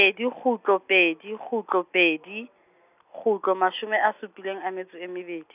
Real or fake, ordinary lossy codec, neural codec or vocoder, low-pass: real; none; none; 3.6 kHz